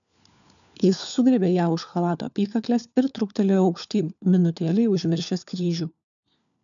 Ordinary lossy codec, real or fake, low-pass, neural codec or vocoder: MP3, 96 kbps; fake; 7.2 kHz; codec, 16 kHz, 4 kbps, FunCodec, trained on LibriTTS, 50 frames a second